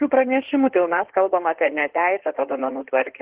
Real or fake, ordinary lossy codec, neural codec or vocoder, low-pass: fake; Opus, 16 kbps; codec, 16 kHz in and 24 kHz out, 2.2 kbps, FireRedTTS-2 codec; 3.6 kHz